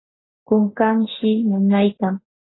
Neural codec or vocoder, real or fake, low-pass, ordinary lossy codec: none; real; 7.2 kHz; AAC, 16 kbps